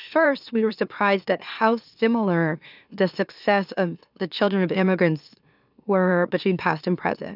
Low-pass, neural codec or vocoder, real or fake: 5.4 kHz; autoencoder, 44.1 kHz, a latent of 192 numbers a frame, MeloTTS; fake